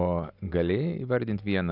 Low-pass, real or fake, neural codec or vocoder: 5.4 kHz; real; none